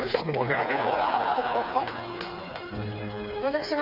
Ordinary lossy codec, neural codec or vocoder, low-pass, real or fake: none; codec, 16 kHz, 4 kbps, FreqCodec, smaller model; 5.4 kHz; fake